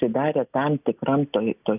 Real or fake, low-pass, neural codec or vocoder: real; 3.6 kHz; none